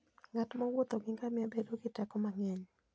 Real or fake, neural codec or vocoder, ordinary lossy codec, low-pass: real; none; none; none